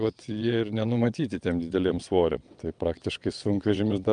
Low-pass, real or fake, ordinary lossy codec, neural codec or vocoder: 9.9 kHz; fake; Opus, 32 kbps; vocoder, 22.05 kHz, 80 mel bands, WaveNeXt